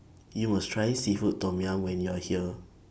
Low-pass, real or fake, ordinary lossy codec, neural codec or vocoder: none; real; none; none